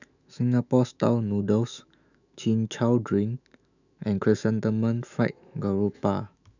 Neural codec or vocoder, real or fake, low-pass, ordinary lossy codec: autoencoder, 48 kHz, 128 numbers a frame, DAC-VAE, trained on Japanese speech; fake; 7.2 kHz; none